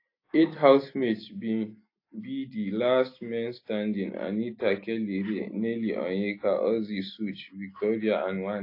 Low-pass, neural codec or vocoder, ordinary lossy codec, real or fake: 5.4 kHz; none; AAC, 32 kbps; real